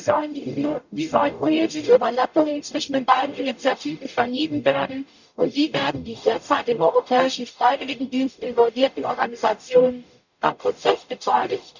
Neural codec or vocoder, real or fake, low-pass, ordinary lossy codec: codec, 44.1 kHz, 0.9 kbps, DAC; fake; 7.2 kHz; none